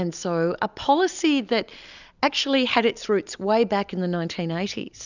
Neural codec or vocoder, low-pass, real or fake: none; 7.2 kHz; real